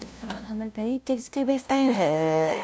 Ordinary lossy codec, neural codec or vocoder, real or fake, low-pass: none; codec, 16 kHz, 0.5 kbps, FunCodec, trained on LibriTTS, 25 frames a second; fake; none